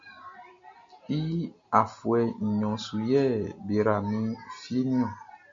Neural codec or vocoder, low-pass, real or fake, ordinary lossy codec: none; 7.2 kHz; real; MP3, 48 kbps